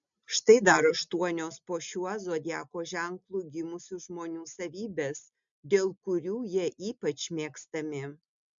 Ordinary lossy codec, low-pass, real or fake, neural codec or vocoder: MP3, 64 kbps; 7.2 kHz; real; none